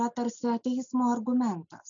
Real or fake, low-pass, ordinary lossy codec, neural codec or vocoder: real; 7.2 kHz; MP3, 96 kbps; none